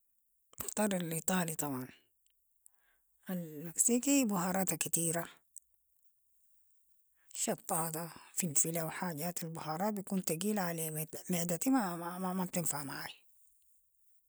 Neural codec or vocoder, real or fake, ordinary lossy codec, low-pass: none; real; none; none